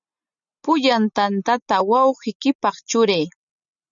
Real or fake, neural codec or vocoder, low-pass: real; none; 7.2 kHz